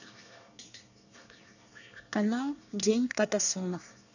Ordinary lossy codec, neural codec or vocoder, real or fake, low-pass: none; codec, 24 kHz, 1 kbps, SNAC; fake; 7.2 kHz